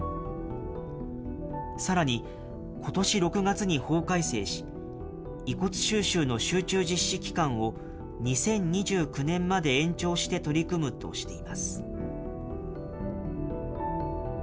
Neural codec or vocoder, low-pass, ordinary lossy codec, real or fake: none; none; none; real